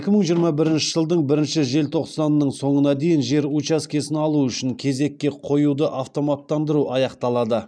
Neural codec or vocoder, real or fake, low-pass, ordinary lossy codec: none; real; none; none